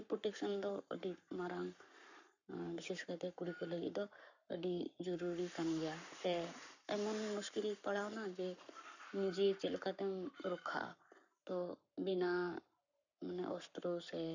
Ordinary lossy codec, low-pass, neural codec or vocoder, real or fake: none; 7.2 kHz; codec, 44.1 kHz, 7.8 kbps, Pupu-Codec; fake